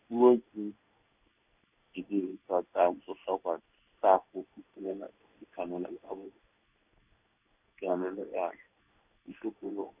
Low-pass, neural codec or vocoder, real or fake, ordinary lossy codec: 3.6 kHz; codec, 16 kHz in and 24 kHz out, 1 kbps, XY-Tokenizer; fake; none